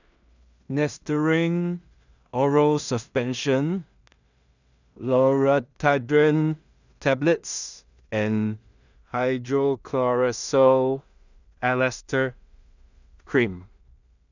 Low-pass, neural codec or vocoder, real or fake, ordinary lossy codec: 7.2 kHz; codec, 16 kHz in and 24 kHz out, 0.4 kbps, LongCat-Audio-Codec, two codebook decoder; fake; none